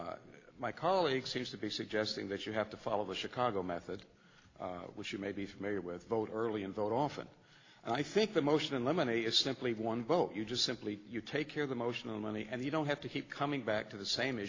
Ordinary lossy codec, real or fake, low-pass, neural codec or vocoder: AAC, 32 kbps; real; 7.2 kHz; none